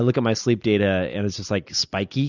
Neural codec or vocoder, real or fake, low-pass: none; real; 7.2 kHz